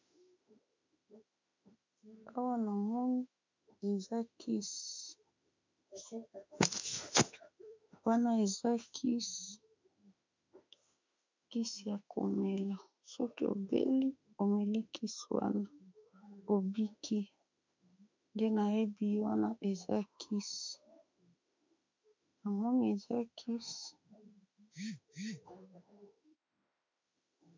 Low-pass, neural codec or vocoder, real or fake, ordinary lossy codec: 7.2 kHz; autoencoder, 48 kHz, 32 numbers a frame, DAC-VAE, trained on Japanese speech; fake; MP3, 64 kbps